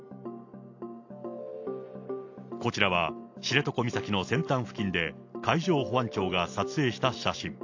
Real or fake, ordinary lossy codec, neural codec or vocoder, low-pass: real; AAC, 48 kbps; none; 7.2 kHz